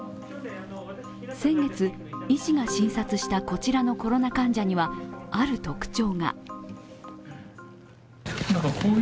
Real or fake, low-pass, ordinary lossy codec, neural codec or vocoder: real; none; none; none